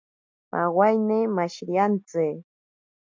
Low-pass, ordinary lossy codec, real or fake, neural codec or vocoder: 7.2 kHz; MP3, 48 kbps; real; none